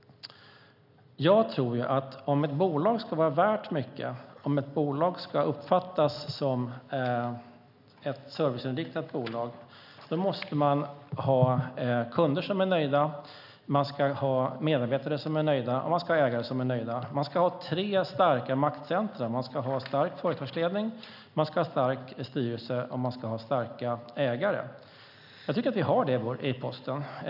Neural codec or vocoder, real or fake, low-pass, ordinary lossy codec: none; real; 5.4 kHz; none